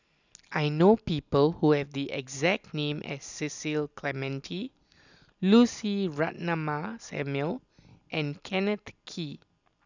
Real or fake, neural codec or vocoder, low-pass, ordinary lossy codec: real; none; 7.2 kHz; none